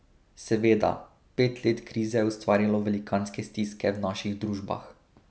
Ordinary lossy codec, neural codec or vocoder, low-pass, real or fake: none; none; none; real